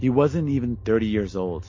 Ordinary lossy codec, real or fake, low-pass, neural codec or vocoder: MP3, 32 kbps; real; 7.2 kHz; none